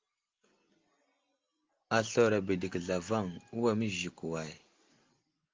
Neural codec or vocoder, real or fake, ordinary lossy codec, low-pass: none; real; Opus, 32 kbps; 7.2 kHz